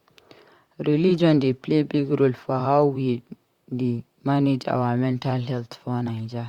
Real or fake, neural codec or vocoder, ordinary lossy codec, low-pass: fake; vocoder, 44.1 kHz, 128 mel bands, Pupu-Vocoder; Opus, 64 kbps; 19.8 kHz